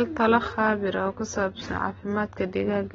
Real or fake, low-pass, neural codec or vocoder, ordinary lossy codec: real; 7.2 kHz; none; AAC, 24 kbps